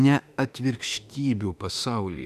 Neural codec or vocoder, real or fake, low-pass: autoencoder, 48 kHz, 32 numbers a frame, DAC-VAE, trained on Japanese speech; fake; 14.4 kHz